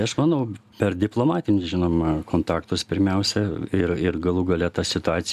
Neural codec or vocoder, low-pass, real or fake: none; 14.4 kHz; real